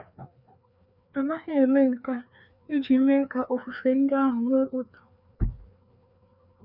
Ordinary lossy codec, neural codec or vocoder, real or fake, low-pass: none; codec, 16 kHz, 2 kbps, FreqCodec, larger model; fake; 5.4 kHz